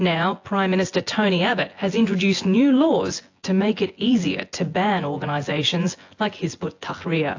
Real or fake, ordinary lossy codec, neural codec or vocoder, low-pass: fake; AAC, 48 kbps; vocoder, 24 kHz, 100 mel bands, Vocos; 7.2 kHz